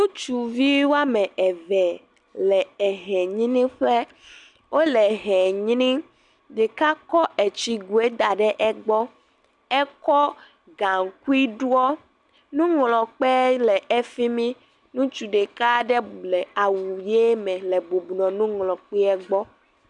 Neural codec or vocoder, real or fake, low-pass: none; real; 10.8 kHz